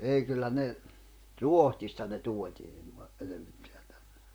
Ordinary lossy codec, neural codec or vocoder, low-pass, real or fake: none; vocoder, 44.1 kHz, 128 mel bands, Pupu-Vocoder; none; fake